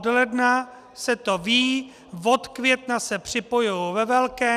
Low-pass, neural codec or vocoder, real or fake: 14.4 kHz; none; real